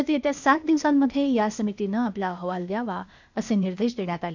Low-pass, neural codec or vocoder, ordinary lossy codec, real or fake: 7.2 kHz; codec, 16 kHz, 0.8 kbps, ZipCodec; none; fake